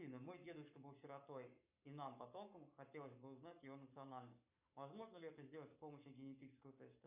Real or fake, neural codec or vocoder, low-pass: fake; codec, 44.1 kHz, 7.8 kbps, Pupu-Codec; 3.6 kHz